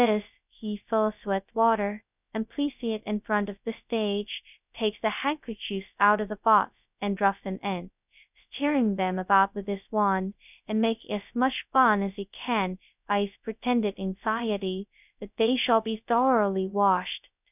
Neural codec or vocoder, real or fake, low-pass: codec, 16 kHz, 0.2 kbps, FocalCodec; fake; 3.6 kHz